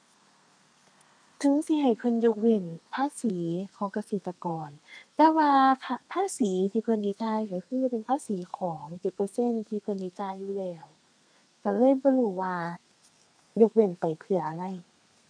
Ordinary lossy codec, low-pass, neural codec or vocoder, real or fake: none; 9.9 kHz; codec, 32 kHz, 1.9 kbps, SNAC; fake